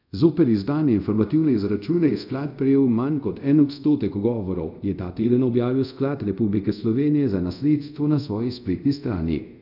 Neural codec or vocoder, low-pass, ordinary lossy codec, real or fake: codec, 24 kHz, 0.5 kbps, DualCodec; 5.4 kHz; none; fake